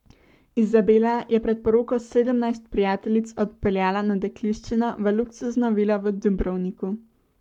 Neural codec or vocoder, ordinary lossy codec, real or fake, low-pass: codec, 44.1 kHz, 7.8 kbps, Pupu-Codec; none; fake; 19.8 kHz